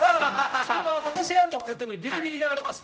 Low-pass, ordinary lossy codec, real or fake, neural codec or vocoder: none; none; fake; codec, 16 kHz, 0.5 kbps, X-Codec, HuBERT features, trained on general audio